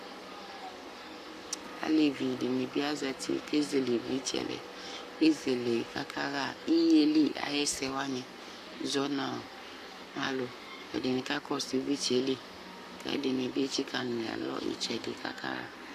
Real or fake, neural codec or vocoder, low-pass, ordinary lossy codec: fake; codec, 44.1 kHz, 7.8 kbps, Pupu-Codec; 14.4 kHz; AAC, 96 kbps